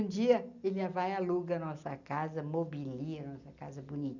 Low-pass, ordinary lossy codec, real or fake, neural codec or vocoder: 7.2 kHz; none; real; none